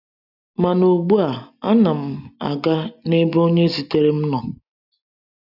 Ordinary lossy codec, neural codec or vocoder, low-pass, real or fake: none; none; 5.4 kHz; real